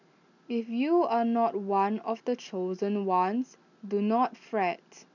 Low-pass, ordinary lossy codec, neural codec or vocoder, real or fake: 7.2 kHz; none; none; real